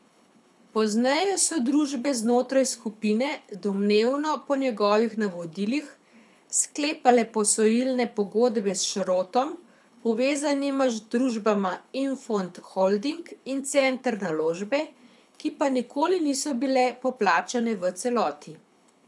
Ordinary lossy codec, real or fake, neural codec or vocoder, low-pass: none; fake; codec, 24 kHz, 6 kbps, HILCodec; none